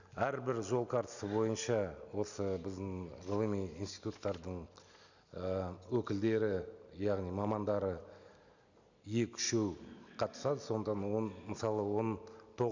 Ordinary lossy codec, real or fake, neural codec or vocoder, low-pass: none; real; none; 7.2 kHz